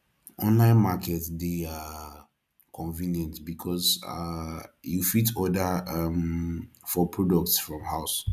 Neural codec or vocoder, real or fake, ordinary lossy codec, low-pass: none; real; none; 14.4 kHz